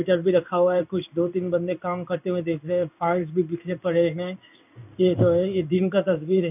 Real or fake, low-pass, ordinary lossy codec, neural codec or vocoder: fake; 3.6 kHz; none; codec, 16 kHz in and 24 kHz out, 1 kbps, XY-Tokenizer